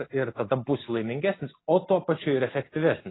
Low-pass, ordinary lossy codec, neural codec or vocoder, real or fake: 7.2 kHz; AAC, 16 kbps; none; real